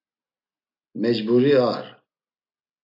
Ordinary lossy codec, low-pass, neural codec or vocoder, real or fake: AAC, 32 kbps; 5.4 kHz; none; real